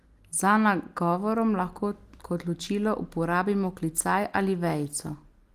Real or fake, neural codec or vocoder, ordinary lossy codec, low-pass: real; none; Opus, 24 kbps; 14.4 kHz